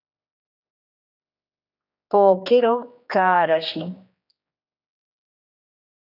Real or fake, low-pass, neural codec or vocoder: fake; 5.4 kHz; codec, 16 kHz, 2 kbps, X-Codec, HuBERT features, trained on general audio